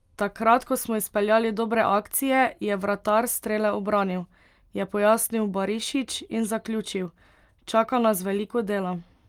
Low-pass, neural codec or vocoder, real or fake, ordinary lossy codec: 19.8 kHz; none; real; Opus, 32 kbps